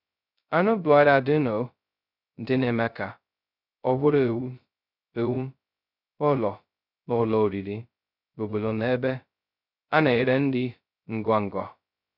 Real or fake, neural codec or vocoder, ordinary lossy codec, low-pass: fake; codec, 16 kHz, 0.2 kbps, FocalCodec; none; 5.4 kHz